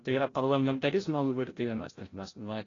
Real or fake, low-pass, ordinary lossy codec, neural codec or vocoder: fake; 7.2 kHz; AAC, 32 kbps; codec, 16 kHz, 0.5 kbps, FreqCodec, larger model